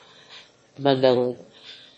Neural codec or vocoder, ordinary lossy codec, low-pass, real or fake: autoencoder, 22.05 kHz, a latent of 192 numbers a frame, VITS, trained on one speaker; MP3, 32 kbps; 9.9 kHz; fake